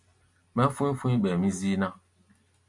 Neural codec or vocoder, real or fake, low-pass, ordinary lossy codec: none; real; 10.8 kHz; MP3, 64 kbps